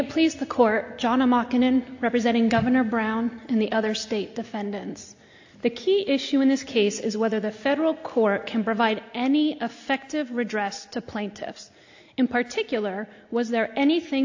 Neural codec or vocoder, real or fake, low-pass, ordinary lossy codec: none; real; 7.2 kHz; AAC, 48 kbps